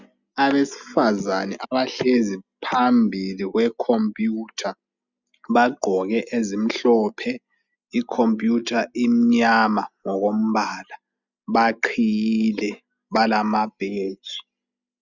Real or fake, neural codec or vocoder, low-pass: real; none; 7.2 kHz